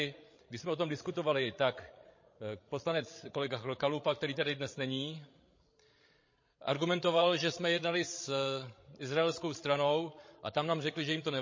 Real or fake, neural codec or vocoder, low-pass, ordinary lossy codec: fake; codec, 16 kHz, 16 kbps, FreqCodec, larger model; 7.2 kHz; MP3, 32 kbps